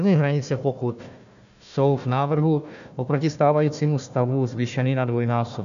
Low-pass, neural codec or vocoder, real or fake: 7.2 kHz; codec, 16 kHz, 1 kbps, FunCodec, trained on Chinese and English, 50 frames a second; fake